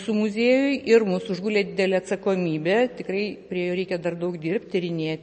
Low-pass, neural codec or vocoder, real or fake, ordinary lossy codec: 10.8 kHz; none; real; MP3, 32 kbps